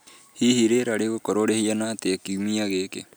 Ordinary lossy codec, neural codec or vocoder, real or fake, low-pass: none; none; real; none